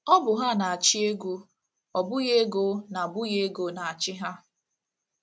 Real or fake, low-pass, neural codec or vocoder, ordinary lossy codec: real; none; none; none